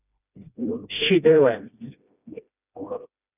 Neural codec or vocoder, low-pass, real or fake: codec, 16 kHz, 1 kbps, FreqCodec, smaller model; 3.6 kHz; fake